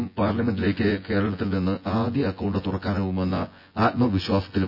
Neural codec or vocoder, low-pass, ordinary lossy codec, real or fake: vocoder, 24 kHz, 100 mel bands, Vocos; 5.4 kHz; none; fake